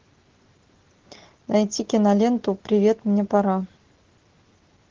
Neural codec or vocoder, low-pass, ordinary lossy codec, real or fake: none; 7.2 kHz; Opus, 16 kbps; real